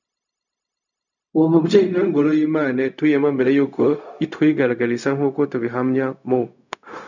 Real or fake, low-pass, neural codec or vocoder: fake; 7.2 kHz; codec, 16 kHz, 0.4 kbps, LongCat-Audio-Codec